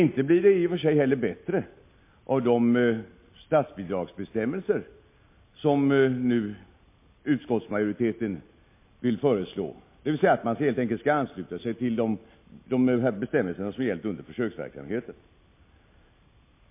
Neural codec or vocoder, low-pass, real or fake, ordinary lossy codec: none; 3.6 kHz; real; MP3, 24 kbps